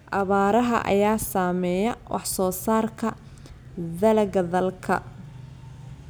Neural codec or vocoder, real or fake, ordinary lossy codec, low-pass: none; real; none; none